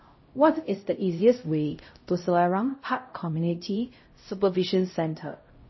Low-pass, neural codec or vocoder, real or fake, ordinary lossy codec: 7.2 kHz; codec, 16 kHz, 0.5 kbps, X-Codec, HuBERT features, trained on LibriSpeech; fake; MP3, 24 kbps